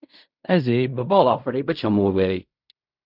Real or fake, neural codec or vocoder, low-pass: fake; codec, 16 kHz in and 24 kHz out, 0.4 kbps, LongCat-Audio-Codec, fine tuned four codebook decoder; 5.4 kHz